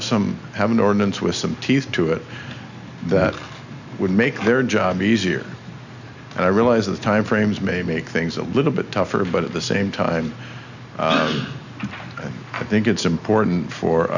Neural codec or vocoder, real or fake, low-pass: none; real; 7.2 kHz